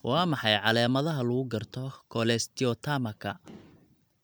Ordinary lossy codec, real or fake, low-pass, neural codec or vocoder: none; real; none; none